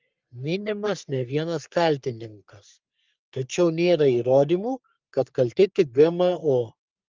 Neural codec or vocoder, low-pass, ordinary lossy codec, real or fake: codec, 44.1 kHz, 3.4 kbps, Pupu-Codec; 7.2 kHz; Opus, 24 kbps; fake